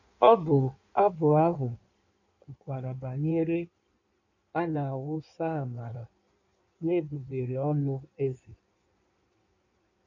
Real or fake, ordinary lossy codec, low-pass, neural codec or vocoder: fake; none; 7.2 kHz; codec, 16 kHz in and 24 kHz out, 1.1 kbps, FireRedTTS-2 codec